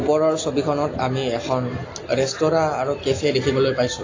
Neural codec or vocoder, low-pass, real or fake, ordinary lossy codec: none; 7.2 kHz; real; AAC, 32 kbps